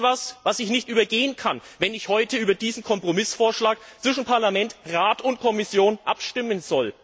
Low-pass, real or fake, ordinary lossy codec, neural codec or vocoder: none; real; none; none